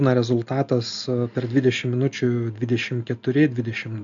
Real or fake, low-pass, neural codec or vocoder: real; 7.2 kHz; none